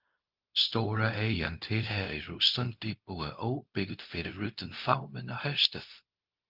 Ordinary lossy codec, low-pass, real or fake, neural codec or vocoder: Opus, 32 kbps; 5.4 kHz; fake; codec, 16 kHz, 0.4 kbps, LongCat-Audio-Codec